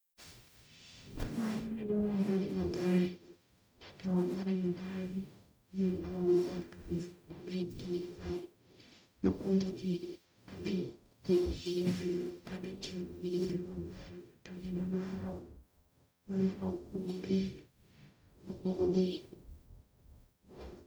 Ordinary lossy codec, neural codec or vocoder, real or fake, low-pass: none; codec, 44.1 kHz, 0.9 kbps, DAC; fake; none